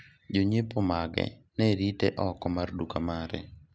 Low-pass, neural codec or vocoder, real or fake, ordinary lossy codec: none; none; real; none